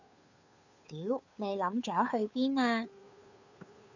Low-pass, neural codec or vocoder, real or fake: 7.2 kHz; codec, 16 kHz, 2 kbps, FunCodec, trained on Chinese and English, 25 frames a second; fake